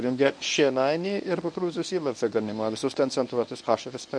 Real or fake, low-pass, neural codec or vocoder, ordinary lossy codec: fake; 9.9 kHz; codec, 24 kHz, 0.9 kbps, WavTokenizer, medium speech release version 1; MP3, 96 kbps